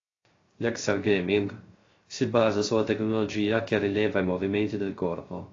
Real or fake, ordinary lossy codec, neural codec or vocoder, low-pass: fake; AAC, 32 kbps; codec, 16 kHz, 0.3 kbps, FocalCodec; 7.2 kHz